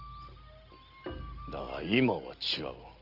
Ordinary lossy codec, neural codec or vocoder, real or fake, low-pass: Opus, 24 kbps; none; real; 5.4 kHz